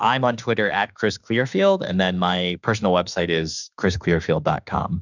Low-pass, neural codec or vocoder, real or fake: 7.2 kHz; autoencoder, 48 kHz, 32 numbers a frame, DAC-VAE, trained on Japanese speech; fake